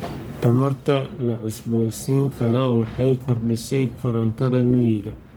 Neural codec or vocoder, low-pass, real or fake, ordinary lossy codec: codec, 44.1 kHz, 1.7 kbps, Pupu-Codec; none; fake; none